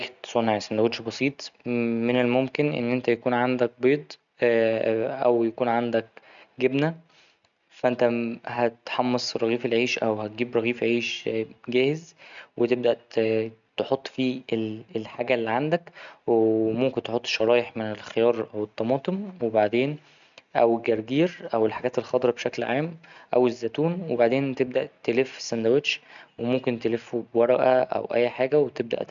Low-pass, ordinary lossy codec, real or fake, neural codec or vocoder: 7.2 kHz; none; real; none